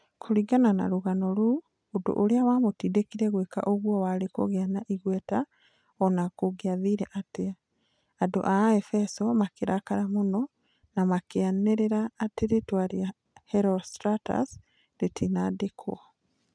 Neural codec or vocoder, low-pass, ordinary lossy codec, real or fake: none; 9.9 kHz; none; real